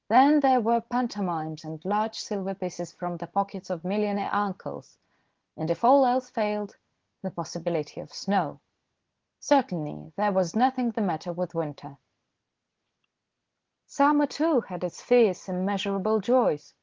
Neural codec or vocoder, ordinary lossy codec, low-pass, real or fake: none; Opus, 16 kbps; 7.2 kHz; real